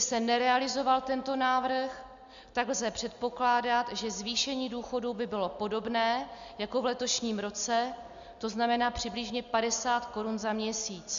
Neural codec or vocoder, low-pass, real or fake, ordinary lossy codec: none; 7.2 kHz; real; Opus, 64 kbps